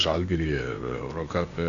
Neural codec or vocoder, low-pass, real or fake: codec, 16 kHz, 0.8 kbps, ZipCodec; 7.2 kHz; fake